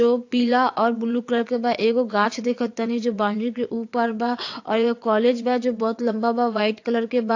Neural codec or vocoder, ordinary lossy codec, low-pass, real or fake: vocoder, 22.05 kHz, 80 mel bands, WaveNeXt; AAC, 48 kbps; 7.2 kHz; fake